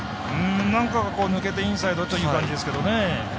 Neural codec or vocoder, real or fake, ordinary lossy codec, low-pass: none; real; none; none